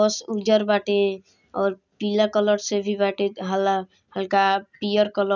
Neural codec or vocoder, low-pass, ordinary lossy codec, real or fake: none; 7.2 kHz; none; real